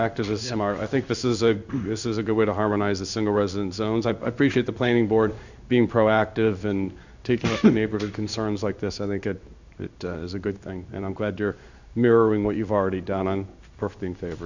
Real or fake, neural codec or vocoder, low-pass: fake; codec, 16 kHz in and 24 kHz out, 1 kbps, XY-Tokenizer; 7.2 kHz